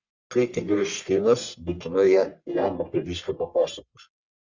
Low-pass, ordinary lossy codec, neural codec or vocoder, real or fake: 7.2 kHz; Opus, 64 kbps; codec, 44.1 kHz, 1.7 kbps, Pupu-Codec; fake